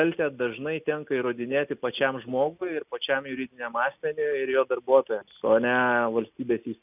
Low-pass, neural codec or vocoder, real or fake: 3.6 kHz; none; real